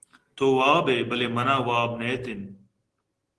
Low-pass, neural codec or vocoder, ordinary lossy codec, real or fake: 10.8 kHz; none; Opus, 16 kbps; real